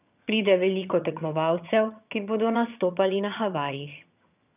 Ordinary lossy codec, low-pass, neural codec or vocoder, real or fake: none; 3.6 kHz; vocoder, 22.05 kHz, 80 mel bands, HiFi-GAN; fake